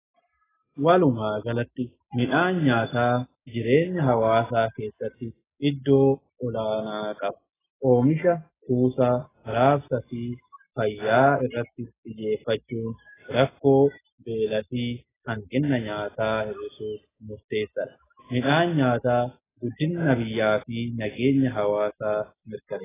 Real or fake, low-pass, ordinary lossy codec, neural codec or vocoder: real; 3.6 kHz; AAC, 16 kbps; none